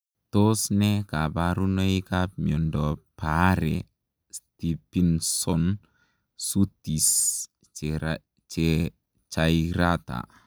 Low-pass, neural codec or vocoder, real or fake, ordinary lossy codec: none; none; real; none